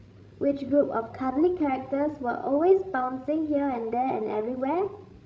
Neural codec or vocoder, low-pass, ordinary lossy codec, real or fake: codec, 16 kHz, 16 kbps, FreqCodec, larger model; none; none; fake